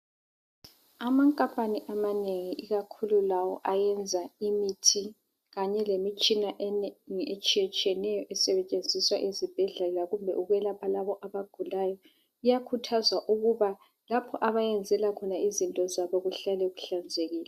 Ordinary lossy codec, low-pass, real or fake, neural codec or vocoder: MP3, 96 kbps; 14.4 kHz; real; none